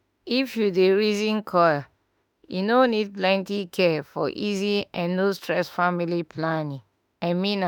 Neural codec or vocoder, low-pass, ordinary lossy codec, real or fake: autoencoder, 48 kHz, 32 numbers a frame, DAC-VAE, trained on Japanese speech; none; none; fake